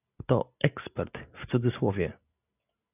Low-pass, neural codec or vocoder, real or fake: 3.6 kHz; none; real